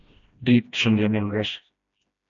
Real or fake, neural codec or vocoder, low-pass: fake; codec, 16 kHz, 1 kbps, FreqCodec, smaller model; 7.2 kHz